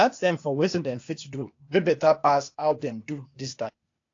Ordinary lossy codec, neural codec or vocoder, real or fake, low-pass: AAC, 48 kbps; codec, 16 kHz, 0.8 kbps, ZipCodec; fake; 7.2 kHz